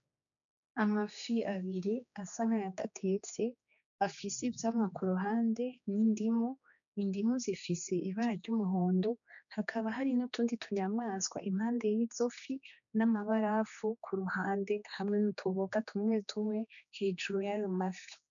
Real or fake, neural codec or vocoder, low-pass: fake; codec, 16 kHz, 2 kbps, X-Codec, HuBERT features, trained on general audio; 7.2 kHz